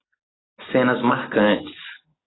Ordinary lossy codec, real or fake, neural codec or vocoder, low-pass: AAC, 16 kbps; real; none; 7.2 kHz